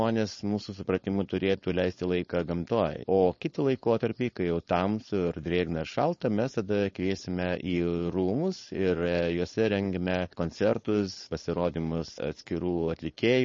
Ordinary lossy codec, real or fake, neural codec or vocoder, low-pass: MP3, 32 kbps; fake; codec, 16 kHz, 4.8 kbps, FACodec; 7.2 kHz